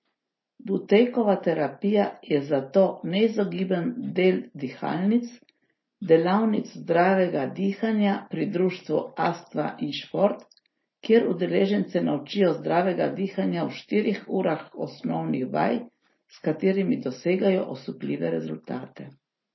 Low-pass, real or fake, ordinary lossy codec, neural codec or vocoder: 7.2 kHz; real; MP3, 24 kbps; none